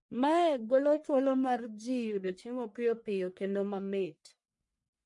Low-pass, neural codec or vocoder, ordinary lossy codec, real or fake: 10.8 kHz; codec, 44.1 kHz, 1.7 kbps, Pupu-Codec; MP3, 48 kbps; fake